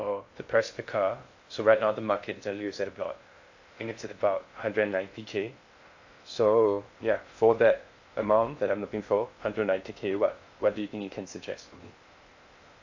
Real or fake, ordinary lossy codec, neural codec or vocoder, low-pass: fake; AAC, 48 kbps; codec, 16 kHz in and 24 kHz out, 0.6 kbps, FocalCodec, streaming, 2048 codes; 7.2 kHz